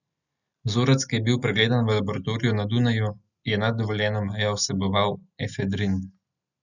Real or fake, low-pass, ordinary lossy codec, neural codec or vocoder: real; 7.2 kHz; none; none